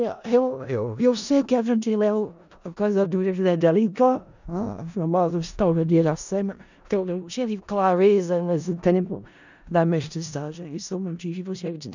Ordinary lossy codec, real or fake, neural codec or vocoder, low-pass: none; fake; codec, 16 kHz in and 24 kHz out, 0.4 kbps, LongCat-Audio-Codec, four codebook decoder; 7.2 kHz